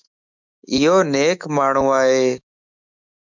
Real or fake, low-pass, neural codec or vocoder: fake; 7.2 kHz; autoencoder, 48 kHz, 128 numbers a frame, DAC-VAE, trained on Japanese speech